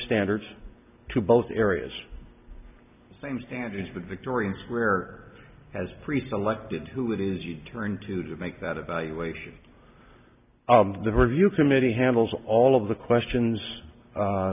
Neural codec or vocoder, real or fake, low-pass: none; real; 3.6 kHz